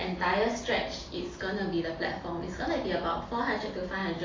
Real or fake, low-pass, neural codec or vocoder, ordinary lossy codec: real; 7.2 kHz; none; AAC, 32 kbps